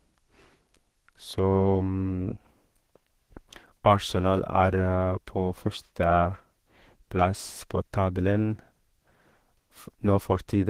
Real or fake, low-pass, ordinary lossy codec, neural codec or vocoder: fake; 14.4 kHz; Opus, 16 kbps; codec, 32 kHz, 1.9 kbps, SNAC